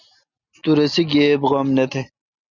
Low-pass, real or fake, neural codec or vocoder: 7.2 kHz; real; none